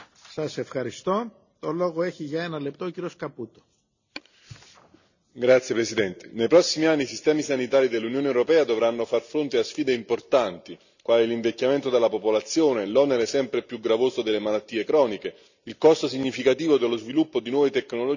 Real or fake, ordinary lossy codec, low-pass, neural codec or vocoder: real; none; 7.2 kHz; none